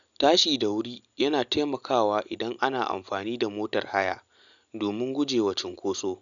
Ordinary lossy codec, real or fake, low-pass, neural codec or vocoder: none; real; 7.2 kHz; none